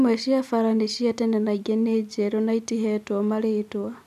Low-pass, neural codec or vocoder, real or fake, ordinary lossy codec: 14.4 kHz; none; real; none